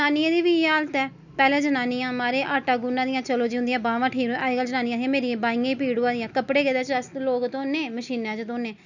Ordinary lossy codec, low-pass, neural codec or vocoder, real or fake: none; 7.2 kHz; none; real